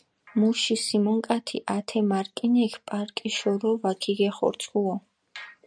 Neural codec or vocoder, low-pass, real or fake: none; 9.9 kHz; real